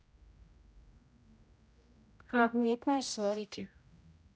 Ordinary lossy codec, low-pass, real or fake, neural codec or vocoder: none; none; fake; codec, 16 kHz, 0.5 kbps, X-Codec, HuBERT features, trained on general audio